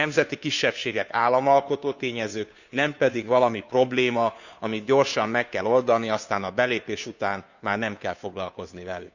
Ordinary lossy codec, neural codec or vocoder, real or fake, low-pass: none; codec, 16 kHz, 2 kbps, FunCodec, trained on Chinese and English, 25 frames a second; fake; 7.2 kHz